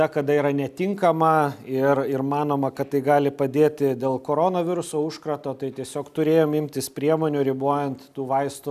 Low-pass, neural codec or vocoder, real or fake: 14.4 kHz; none; real